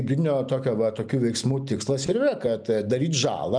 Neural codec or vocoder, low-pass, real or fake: none; 9.9 kHz; real